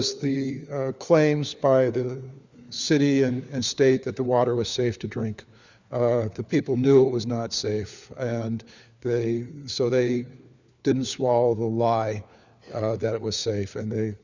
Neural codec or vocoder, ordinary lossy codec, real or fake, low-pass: codec, 16 kHz, 4 kbps, FunCodec, trained on LibriTTS, 50 frames a second; Opus, 64 kbps; fake; 7.2 kHz